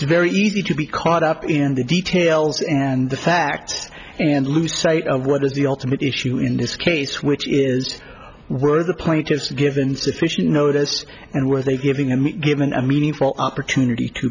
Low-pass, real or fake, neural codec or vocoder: 7.2 kHz; real; none